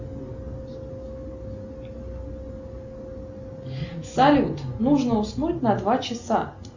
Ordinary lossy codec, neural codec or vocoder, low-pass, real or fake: Opus, 64 kbps; none; 7.2 kHz; real